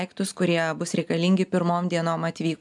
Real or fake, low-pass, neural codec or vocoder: real; 10.8 kHz; none